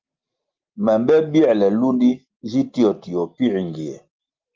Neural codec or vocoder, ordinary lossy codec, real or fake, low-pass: none; Opus, 24 kbps; real; 7.2 kHz